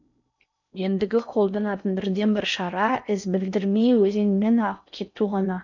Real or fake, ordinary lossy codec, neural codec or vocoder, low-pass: fake; none; codec, 16 kHz in and 24 kHz out, 0.6 kbps, FocalCodec, streaming, 4096 codes; 7.2 kHz